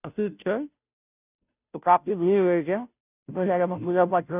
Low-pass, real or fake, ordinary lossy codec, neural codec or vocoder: 3.6 kHz; fake; none; codec, 16 kHz, 0.5 kbps, FunCodec, trained on Chinese and English, 25 frames a second